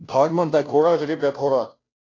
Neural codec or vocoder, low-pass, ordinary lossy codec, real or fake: codec, 16 kHz, 0.5 kbps, FunCodec, trained on Chinese and English, 25 frames a second; 7.2 kHz; AAC, 32 kbps; fake